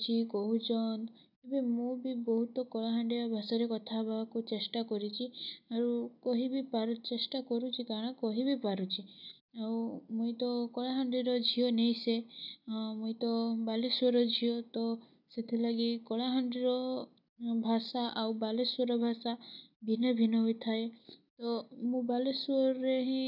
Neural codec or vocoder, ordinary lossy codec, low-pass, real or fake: none; none; 5.4 kHz; real